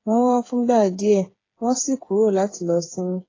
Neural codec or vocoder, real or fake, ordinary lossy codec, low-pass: codec, 16 kHz, 8 kbps, FreqCodec, smaller model; fake; AAC, 32 kbps; 7.2 kHz